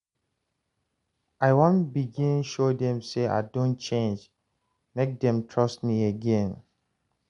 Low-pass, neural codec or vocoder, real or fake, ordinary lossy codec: 10.8 kHz; none; real; MP3, 64 kbps